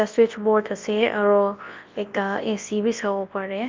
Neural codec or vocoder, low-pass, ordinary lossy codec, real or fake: codec, 24 kHz, 0.9 kbps, WavTokenizer, large speech release; 7.2 kHz; Opus, 32 kbps; fake